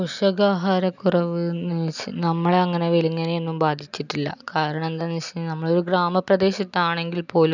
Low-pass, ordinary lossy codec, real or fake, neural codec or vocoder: 7.2 kHz; none; real; none